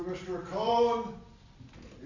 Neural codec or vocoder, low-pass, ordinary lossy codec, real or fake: none; 7.2 kHz; Opus, 64 kbps; real